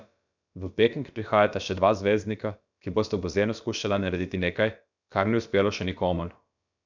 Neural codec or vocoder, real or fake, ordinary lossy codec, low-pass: codec, 16 kHz, about 1 kbps, DyCAST, with the encoder's durations; fake; none; 7.2 kHz